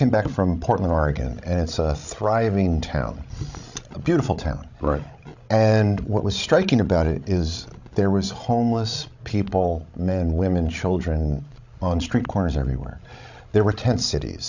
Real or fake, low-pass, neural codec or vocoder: fake; 7.2 kHz; codec, 16 kHz, 16 kbps, FreqCodec, larger model